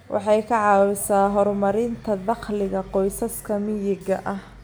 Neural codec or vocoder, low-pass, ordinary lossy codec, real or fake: none; none; none; real